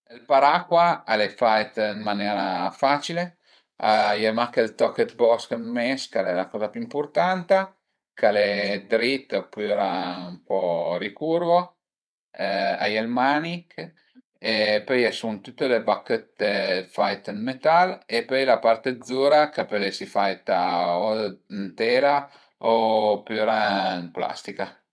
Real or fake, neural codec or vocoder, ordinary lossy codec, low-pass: fake; vocoder, 22.05 kHz, 80 mel bands, WaveNeXt; none; none